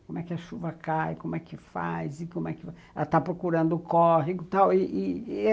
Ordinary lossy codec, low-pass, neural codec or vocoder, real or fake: none; none; none; real